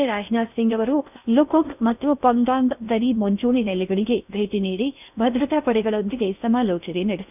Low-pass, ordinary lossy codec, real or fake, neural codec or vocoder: 3.6 kHz; none; fake; codec, 16 kHz in and 24 kHz out, 0.6 kbps, FocalCodec, streaming, 4096 codes